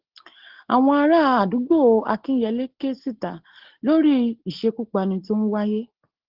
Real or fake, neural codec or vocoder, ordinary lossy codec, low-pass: real; none; Opus, 16 kbps; 5.4 kHz